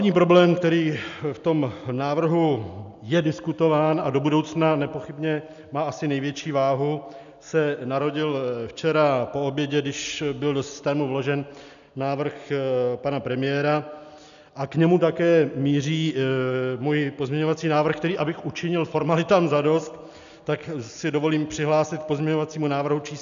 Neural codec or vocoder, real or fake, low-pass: none; real; 7.2 kHz